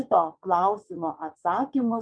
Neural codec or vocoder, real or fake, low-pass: vocoder, 22.05 kHz, 80 mel bands, WaveNeXt; fake; 9.9 kHz